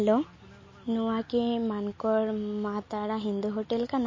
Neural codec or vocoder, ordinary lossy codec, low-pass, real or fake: none; MP3, 32 kbps; 7.2 kHz; real